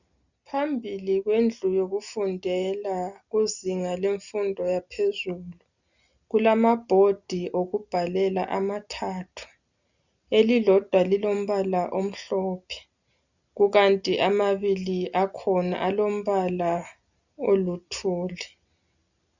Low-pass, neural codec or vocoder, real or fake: 7.2 kHz; none; real